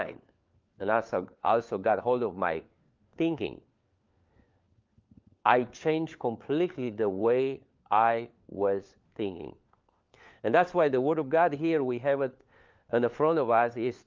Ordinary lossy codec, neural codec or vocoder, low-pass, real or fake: Opus, 24 kbps; codec, 16 kHz, 4 kbps, FunCodec, trained on LibriTTS, 50 frames a second; 7.2 kHz; fake